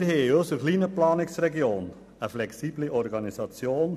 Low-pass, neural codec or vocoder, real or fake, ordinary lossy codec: 14.4 kHz; none; real; none